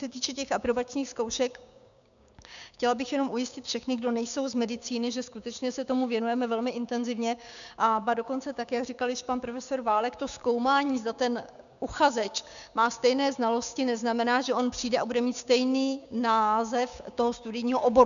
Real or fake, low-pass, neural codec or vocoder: fake; 7.2 kHz; codec, 16 kHz, 6 kbps, DAC